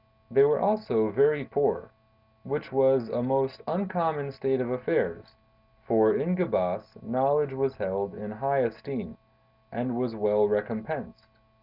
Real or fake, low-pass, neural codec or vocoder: real; 5.4 kHz; none